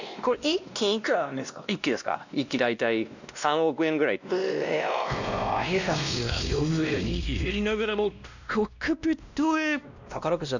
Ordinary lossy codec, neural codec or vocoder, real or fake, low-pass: none; codec, 16 kHz, 1 kbps, X-Codec, WavLM features, trained on Multilingual LibriSpeech; fake; 7.2 kHz